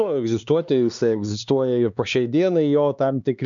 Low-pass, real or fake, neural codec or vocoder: 7.2 kHz; fake; codec, 16 kHz, 2 kbps, X-Codec, HuBERT features, trained on LibriSpeech